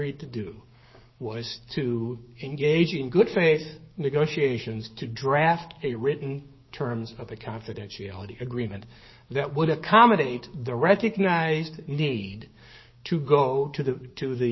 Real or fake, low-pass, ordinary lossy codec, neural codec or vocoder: fake; 7.2 kHz; MP3, 24 kbps; codec, 16 kHz, 6 kbps, DAC